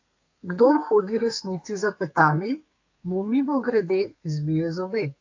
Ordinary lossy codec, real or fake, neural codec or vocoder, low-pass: AAC, 48 kbps; fake; codec, 32 kHz, 1.9 kbps, SNAC; 7.2 kHz